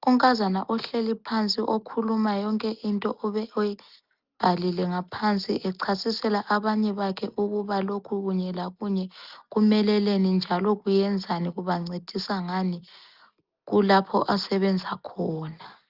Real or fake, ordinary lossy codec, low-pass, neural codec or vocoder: real; Opus, 32 kbps; 5.4 kHz; none